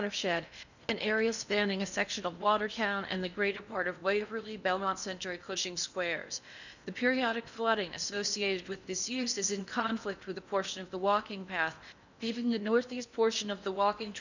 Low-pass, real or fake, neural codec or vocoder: 7.2 kHz; fake; codec, 16 kHz in and 24 kHz out, 0.8 kbps, FocalCodec, streaming, 65536 codes